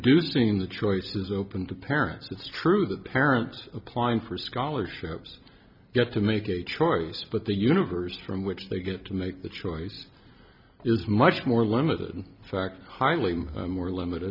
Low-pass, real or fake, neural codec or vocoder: 5.4 kHz; real; none